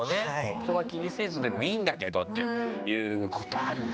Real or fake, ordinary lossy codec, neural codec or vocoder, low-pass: fake; none; codec, 16 kHz, 2 kbps, X-Codec, HuBERT features, trained on general audio; none